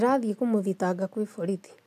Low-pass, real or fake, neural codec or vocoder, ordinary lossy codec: 14.4 kHz; real; none; MP3, 96 kbps